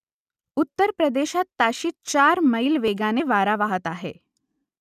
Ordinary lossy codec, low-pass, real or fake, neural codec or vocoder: none; 14.4 kHz; real; none